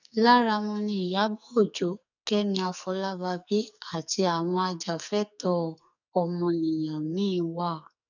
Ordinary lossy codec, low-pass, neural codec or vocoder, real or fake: none; 7.2 kHz; codec, 44.1 kHz, 2.6 kbps, SNAC; fake